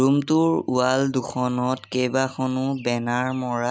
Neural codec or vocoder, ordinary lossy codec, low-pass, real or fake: none; none; none; real